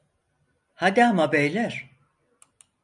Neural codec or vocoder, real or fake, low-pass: none; real; 10.8 kHz